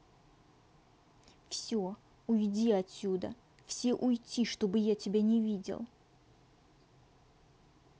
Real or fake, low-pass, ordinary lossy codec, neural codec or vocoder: real; none; none; none